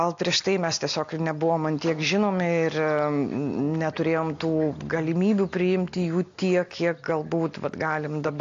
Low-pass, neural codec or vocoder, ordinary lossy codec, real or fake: 7.2 kHz; none; AAC, 48 kbps; real